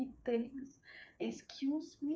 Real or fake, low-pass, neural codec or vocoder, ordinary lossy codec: fake; none; codec, 16 kHz, 4 kbps, FreqCodec, smaller model; none